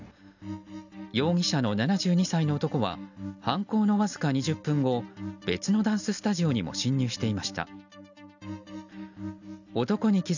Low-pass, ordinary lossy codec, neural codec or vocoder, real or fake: 7.2 kHz; none; none; real